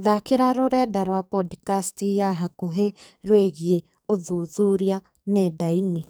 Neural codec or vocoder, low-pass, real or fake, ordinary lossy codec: codec, 44.1 kHz, 2.6 kbps, SNAC; none; fake; none